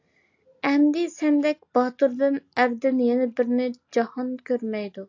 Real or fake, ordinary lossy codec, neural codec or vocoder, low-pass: real; AAC, 48 kbps; none; 7.2 kHz